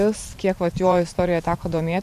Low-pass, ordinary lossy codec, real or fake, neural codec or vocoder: 14.4 kHz; AAC, 96 kbps; fake; vocoder, 44.1 kHz, 128 mel bands every 512 samples, BigVGAN v2